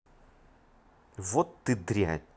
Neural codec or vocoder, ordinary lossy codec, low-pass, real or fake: none; none; none; real